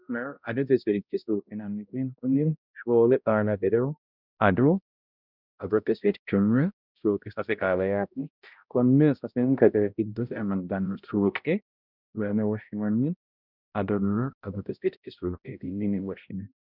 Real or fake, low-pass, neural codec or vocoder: fake; 5.4 kHz; codec, 16 kHz, 0.5 kbps, X-Codec, HuBERT features, trained on balanced general audio